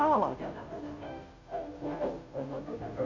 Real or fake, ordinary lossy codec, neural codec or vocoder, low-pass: fake; MP3, 48 kbps; codec, 16 kHz, 0.5 kbps, FunCodec, trained on Chinese and English, 25 frames a second; 7.2 kHz